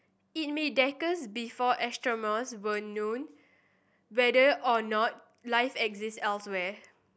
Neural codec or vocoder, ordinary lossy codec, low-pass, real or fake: none; none; none; real